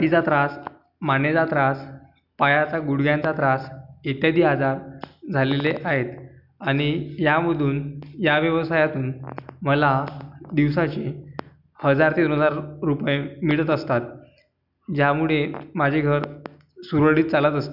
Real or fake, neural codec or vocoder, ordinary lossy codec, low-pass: real; none; none; 5.4 kHz